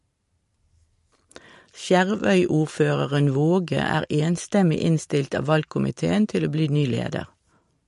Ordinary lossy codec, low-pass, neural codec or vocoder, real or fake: MP3, 48 kbps; 14.4 kHz; vocoder, 44.1 kHz, 128 mel bands every 512 samples, BigVGAN v2; fake